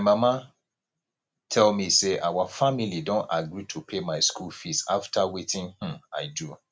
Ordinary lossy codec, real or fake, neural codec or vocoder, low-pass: none; real; none; none